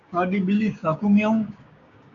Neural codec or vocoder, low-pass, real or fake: codec, 16 kHz, 6 kbps, DAC; 7.2 kHz; fake